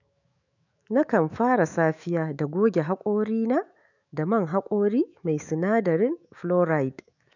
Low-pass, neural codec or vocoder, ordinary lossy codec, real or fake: 7.2 kHz; autoencoder, 48 kHz, 128 numbers a frame, DAC-VAE, trained on Japanese speech; none; fake